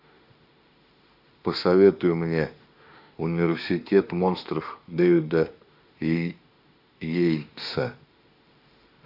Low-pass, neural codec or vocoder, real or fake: 5.4 kHz; autoencoder, 48 kHz, 32 numbers a frame, DAC-VAE, trained on Japanese speech; fake